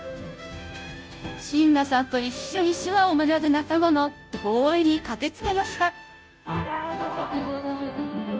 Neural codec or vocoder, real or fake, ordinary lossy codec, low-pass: codec, 16 kHz, 0.5 kbps, FunCodec, trained on Chinese and English, 25 frames a second; fake; none; none